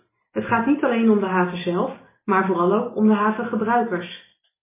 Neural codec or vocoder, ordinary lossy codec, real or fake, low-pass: none; MP3, 16 kbps; real; 3.6 kHz